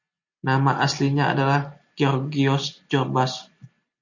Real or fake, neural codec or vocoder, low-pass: real; none; 7.2 kHz